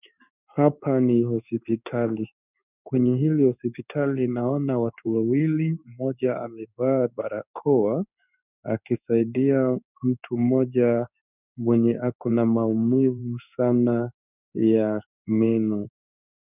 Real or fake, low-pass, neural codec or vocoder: fake; 3.6 kHz; codec, 16 kHz in and 24 kHz out, 1 kbps, XY-Tokenizer